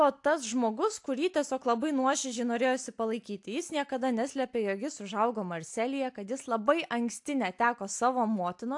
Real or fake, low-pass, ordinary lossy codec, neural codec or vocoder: real; 10.8 kHz; AAC, 64 kbps; none